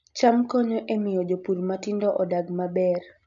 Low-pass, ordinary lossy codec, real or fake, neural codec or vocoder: 7.2 kHz; none; real; none